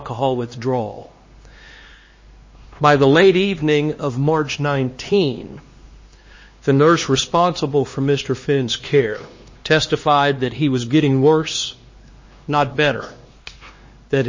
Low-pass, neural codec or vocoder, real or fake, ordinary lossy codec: 7.2 kHz; codec, 16 kHz, 2 kbps, X-Codec, HuBERT features, trained on LibriSpeech; fake; MP3, 32 kbps